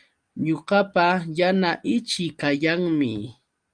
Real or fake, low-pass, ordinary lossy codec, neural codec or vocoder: real; 9.9 kHz; Opus, 32 kbps; none